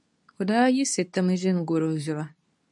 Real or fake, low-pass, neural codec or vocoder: fake; 10.8 kHz; codec, 24 kHz, 0.9 kbps, WavTokenizer, medium speech release version 2